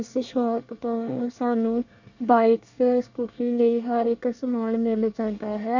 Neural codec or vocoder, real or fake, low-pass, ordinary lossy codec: codec, 24 kHz, 1 kbps, SNAC; fake; 7.2 kHz; none